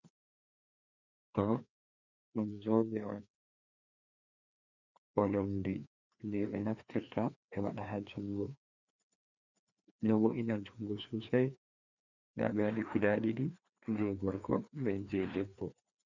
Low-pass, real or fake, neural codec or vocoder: 7.2 kHz; fake; codec, 16 kHz, 2 kbps, FreqCodec, larger model